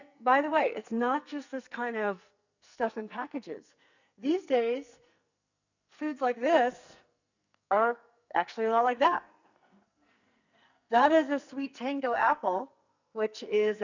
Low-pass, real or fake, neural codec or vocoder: 7.2 kHz; fake; codec, 44.1 kHz, 2.6 kbps, SNAC